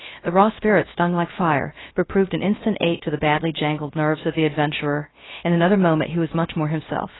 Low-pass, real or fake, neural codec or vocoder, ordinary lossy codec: 7.2 kHz; fake; codec, 16 kHz, 0.3 kbps, FocalCodec; AAC, 16 kbps